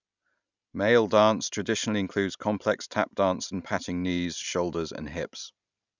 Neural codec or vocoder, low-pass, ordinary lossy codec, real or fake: none; 7.2 kHz; none; real